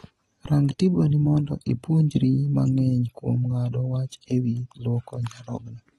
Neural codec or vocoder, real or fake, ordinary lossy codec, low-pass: none; real; AAC, 32 kbps; 19.8 kHz